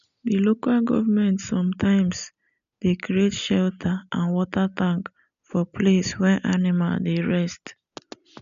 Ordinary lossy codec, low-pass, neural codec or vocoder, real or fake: none; 7.2 kHz; none; real